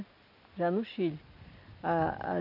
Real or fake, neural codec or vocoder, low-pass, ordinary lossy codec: real; none; 5.4 kHz; none